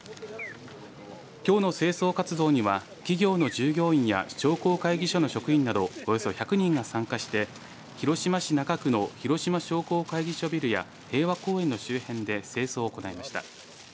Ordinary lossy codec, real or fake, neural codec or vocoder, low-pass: none; real; none; none